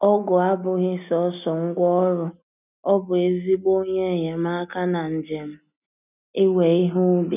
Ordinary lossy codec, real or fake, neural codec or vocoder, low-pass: none; real; none; 3.6 kHz